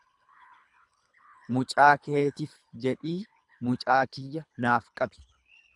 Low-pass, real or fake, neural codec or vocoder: 10.8 kHz; fake; codec, 24 kHz, 3 kbps, HILCodec